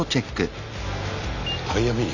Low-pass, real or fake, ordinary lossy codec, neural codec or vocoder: 7.2 kHz; real; none; none